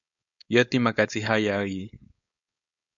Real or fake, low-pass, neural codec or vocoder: fake; 7.2 kHz; codec, 16 kHz, 4.8 kbps, FACodec